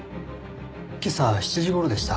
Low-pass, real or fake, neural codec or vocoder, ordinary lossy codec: none; real; none; none